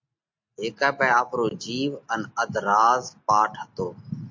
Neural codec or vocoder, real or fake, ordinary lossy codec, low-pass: none; real; MP3, 48 kbps; 7.2 kHz